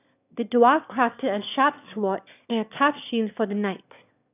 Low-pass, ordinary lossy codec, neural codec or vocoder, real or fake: 3.6 kHz; none; autoencoder, 22.05 kHz, a latent of 192 numbers a frame, VITS, trained on one speaker; fake